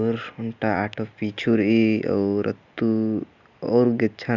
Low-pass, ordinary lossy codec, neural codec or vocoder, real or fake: 7.2 kHz; Opus, 64 kbps; none; real